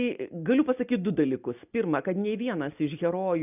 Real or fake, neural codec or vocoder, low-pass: real; none; 3.6 kHz